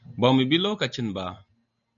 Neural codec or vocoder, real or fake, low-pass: none; real; 7.2 kHz